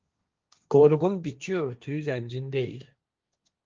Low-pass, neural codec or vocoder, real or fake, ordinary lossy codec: 7.2 kHz; codec, 16 kHz, 1.1 kbps, Voila-Tokenizer; fake; Opus, 24 kbps